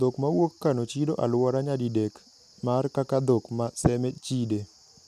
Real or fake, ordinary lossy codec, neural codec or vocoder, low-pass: real; none; none; 14.4 kHz